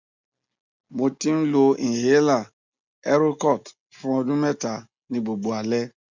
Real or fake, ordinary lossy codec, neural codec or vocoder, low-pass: real; Opus, 64 kbps; none; 7.2 kHz